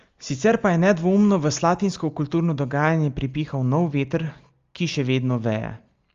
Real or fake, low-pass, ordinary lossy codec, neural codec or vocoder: real; 7.2 kHz; Opus, 32 kbps; none